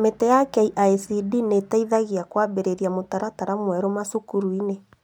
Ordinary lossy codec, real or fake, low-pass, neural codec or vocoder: none; real; none; none